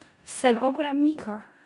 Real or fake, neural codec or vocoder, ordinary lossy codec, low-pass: fake; codec, 16 kHz in and 24 kHz out, 0.4 kbps, LongCat-Audio-Codec, four codebook decoder; MP3, 64 kbps; 10.8 kHz